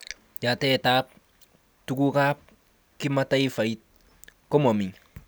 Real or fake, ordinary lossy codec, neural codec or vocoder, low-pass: real; none; none; none